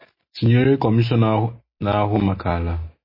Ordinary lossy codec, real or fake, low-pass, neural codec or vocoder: MP3, 24 kbps; real; 5.4 kHz; none